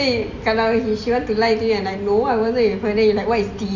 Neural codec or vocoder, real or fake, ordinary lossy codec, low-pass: none; real; none; 7.2 kHz